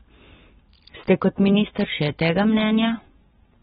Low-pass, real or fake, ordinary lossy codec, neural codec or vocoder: 19.8 kHz; real; AAC, 16 kbps; none